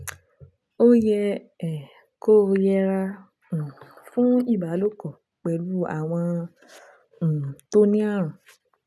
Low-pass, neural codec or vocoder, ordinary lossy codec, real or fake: none; none; none; real